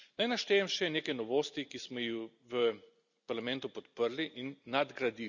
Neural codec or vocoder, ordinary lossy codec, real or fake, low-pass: none; none; real; 7.2 kHz